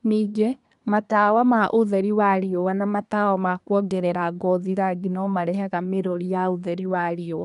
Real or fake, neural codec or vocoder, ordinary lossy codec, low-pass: fake; codec, 24 kHz, 1 kbps, SNAC; none; 10.8 kHz